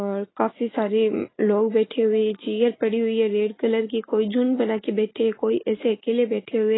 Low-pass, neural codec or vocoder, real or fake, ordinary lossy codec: 7.2 kHz; none; real; AAC, 16 kbps